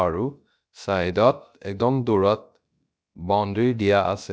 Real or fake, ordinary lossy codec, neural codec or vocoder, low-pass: fake; none; codec, 16 kHz, about 1 kbps, DyCAST, with the encoder's durations; none